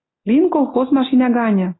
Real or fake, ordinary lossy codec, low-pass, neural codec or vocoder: real; AAC, 16 kbps; 7.2 kHz; none